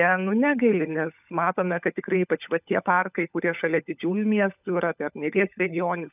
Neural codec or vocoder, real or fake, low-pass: codec, 16 kHz, 16 kbps, FunCodec, trained on LibriTTS, 50 frames a second; fake; 3.6 kHz